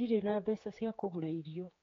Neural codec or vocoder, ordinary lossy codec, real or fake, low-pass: codec, 16 kHz, 4 kbps, X-Codec, HuBERT features, trained on general audio; AAC, 32 kbps; fake; 7.2 kHz